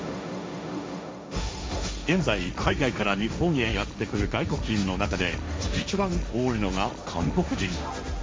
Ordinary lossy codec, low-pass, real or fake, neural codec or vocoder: none; none; fake; codec, 16 kHz, 1.1 kbps, Voila-Tokenizer